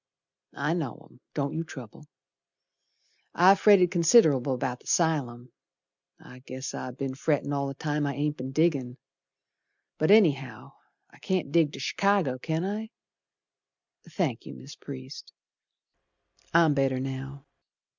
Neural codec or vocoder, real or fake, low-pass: none; real; 7.2 kHz